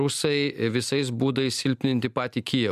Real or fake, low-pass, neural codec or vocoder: real; 14.4 kHz; none